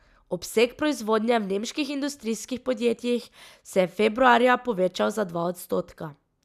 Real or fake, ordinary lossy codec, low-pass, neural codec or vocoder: real; none; 14.4 kHz; none